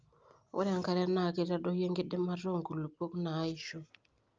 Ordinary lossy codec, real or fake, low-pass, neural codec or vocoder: Opus, 24 kbps; real; 7.2 kHz; none